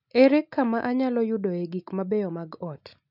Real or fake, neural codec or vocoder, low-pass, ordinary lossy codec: real; none; 5.4 kHz; none